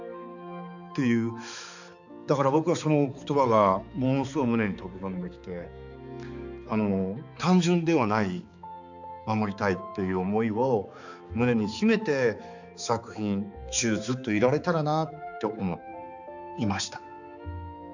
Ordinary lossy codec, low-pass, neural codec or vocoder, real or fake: none; 7.2 kHz; codec, 16 kHz, 4 kbps, X-Codec, HuBERT features, trained on balanced general audio; fake